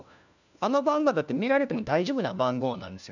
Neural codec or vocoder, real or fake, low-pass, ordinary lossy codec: codec, 16 kHz, 1 kbps, FunCodec, trained on LibriTTS, 50 frames a second; fake; 7.2 kHz; none